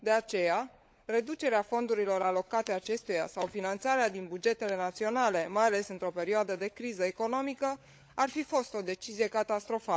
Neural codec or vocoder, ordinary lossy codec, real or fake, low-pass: codec, 16 kHz, 16 kbps, FunCodec, trained on LibriTTS, 50 frames a second; none; fake; none